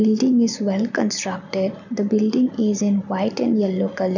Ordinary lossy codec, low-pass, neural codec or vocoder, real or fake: none; 7.2 kHz; none; real